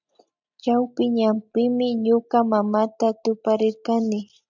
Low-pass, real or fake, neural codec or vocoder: 7.2 kHz; real; none